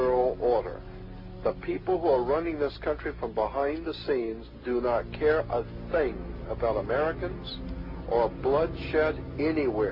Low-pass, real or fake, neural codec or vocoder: 5.4 kHz; real; none